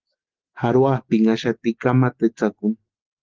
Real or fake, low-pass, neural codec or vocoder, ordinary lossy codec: real; 7.2 kHz; none; Opus, 16 kbps